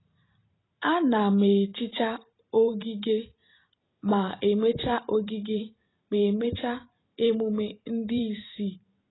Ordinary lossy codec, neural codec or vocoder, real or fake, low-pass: AAC, 16 kbps; vocoder, 44.1 kHz, 128 mel bands every 512 samples, BigVGAN v2; fake; 7.2 kHz